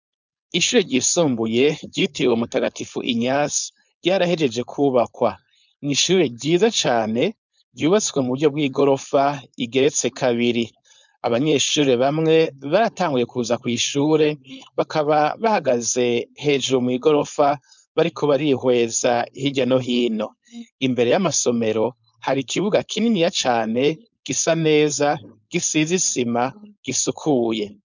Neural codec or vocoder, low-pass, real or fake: codec, 16 kHz, 4.8 kbps, FACodec; 7.2 kHz; fake